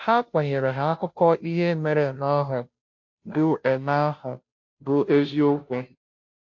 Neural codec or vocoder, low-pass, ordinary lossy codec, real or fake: codec, 16 kHz, 0.5 kbps, FunCodec, trained on Chinese and English, 25 frames a second; 7.2 kHz; MP3, 48 kbps; fake